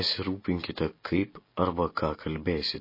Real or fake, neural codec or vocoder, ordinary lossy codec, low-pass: real; none; MP3, 24 kbps; 5.4 kHz